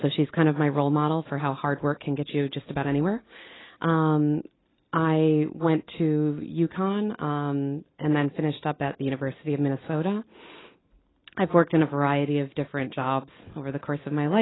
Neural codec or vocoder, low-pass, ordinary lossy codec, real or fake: none; 7.2 kHz; AAC, 16 kbps; real